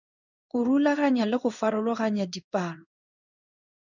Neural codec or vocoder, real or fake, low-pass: codec, 16 kHz in and 24 kHz out, 1 kbps, XY-Tokenizer; fake; 7.2 kHz